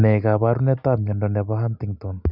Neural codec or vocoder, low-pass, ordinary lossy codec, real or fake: none; 5.4 kHz; none; real